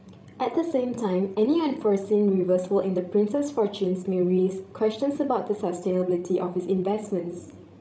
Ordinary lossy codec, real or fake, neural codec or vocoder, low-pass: none; fake; codec, 16 kHz, 16 kbps, FreqCodec, larger model; none